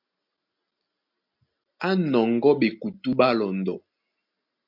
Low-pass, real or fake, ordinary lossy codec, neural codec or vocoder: 5.4 kHz; real; MP3, 48 kbps; none